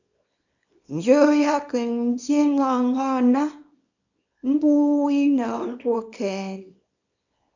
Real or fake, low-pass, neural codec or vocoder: fake; 7.2 kHz; codec, 24 kHz, 0.9 kbps, WavTokenizer, small release